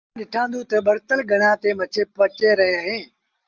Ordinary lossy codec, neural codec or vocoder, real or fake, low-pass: Opus, 32 kbps; none; real; 7.2 kHz